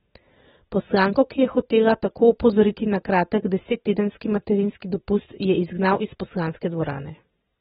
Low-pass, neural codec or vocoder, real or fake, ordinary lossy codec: 19.8 kHz; none; real; AAC, 16 kbps